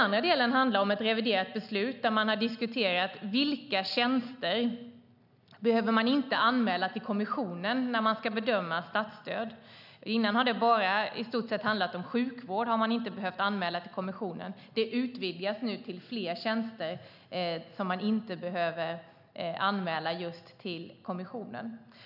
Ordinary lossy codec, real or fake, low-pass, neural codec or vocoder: none; real; 5.4 kHz; none